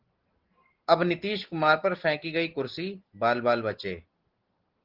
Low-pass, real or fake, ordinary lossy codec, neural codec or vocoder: 5.4 kHz; real; Opus, 16 kbps; none